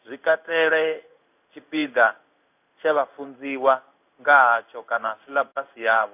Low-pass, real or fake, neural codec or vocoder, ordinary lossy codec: 3.6 kHz; fake; codec, 16 kHz in and 24 kHz out, 1 kbps, XY-Tokenizer; none